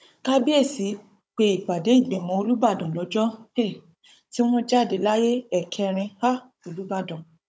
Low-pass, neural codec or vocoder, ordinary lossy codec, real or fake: none; codec, 16 kHz, 16 kbps, FunCodec, trained on Chinese and English, 50 frames a second; none; fake